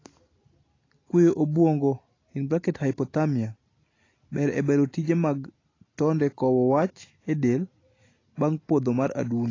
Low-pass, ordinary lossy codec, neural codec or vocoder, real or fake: 7.2 kHz; AAC, 32 kbps; none; real